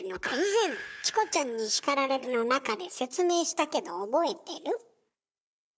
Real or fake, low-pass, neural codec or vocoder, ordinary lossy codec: fake; none; codec, 16 kHz, 4 kbps, FunCodec, trained on Chinese and English, 50 frames a second; none